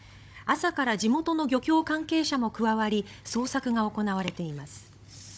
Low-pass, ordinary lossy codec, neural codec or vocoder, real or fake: none; none; codec, 16 kHz, 16 kbps, FunCodec, trained on Chinese and English, 50 frames a second; fake